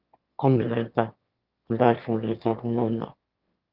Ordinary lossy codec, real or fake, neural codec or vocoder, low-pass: Opus, 24 kbps; fake; autoencoder, 22.05 kHz, a latent of 192 numbers a frame, VITS, trained on one speaker; 5.4 kHz